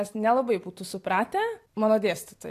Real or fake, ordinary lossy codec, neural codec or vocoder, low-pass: real; AAC, 64 kbps; none; 14.4 kHz